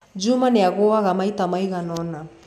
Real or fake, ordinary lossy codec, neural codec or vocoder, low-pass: real; none; none; 14.4 kHz